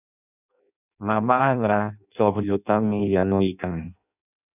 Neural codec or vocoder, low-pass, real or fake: codec, 16 kHz in and 24 kHz out, 0.6 kbps, FireRedTTS-2 codec; 3.6 kHz; fake